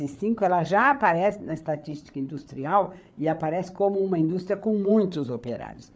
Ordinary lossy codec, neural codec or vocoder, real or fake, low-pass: none; codec, 16 kHz, 8 kbps, FreqCodec, larger model; fake; none